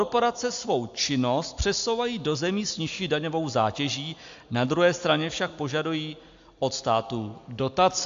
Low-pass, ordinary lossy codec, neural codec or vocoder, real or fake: 7.2 kHz; AAC, 64 kbps; none; real